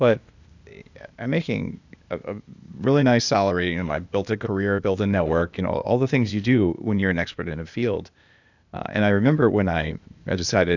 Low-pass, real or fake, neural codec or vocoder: 7.2 kHz; fake; codec, 16 kHz, 0.8 kbps, ZipCodec